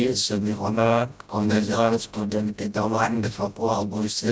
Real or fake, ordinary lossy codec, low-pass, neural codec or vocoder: fake; none; none; codec, 16 kHz, 0.5 kbps, FreqCodec, smaller model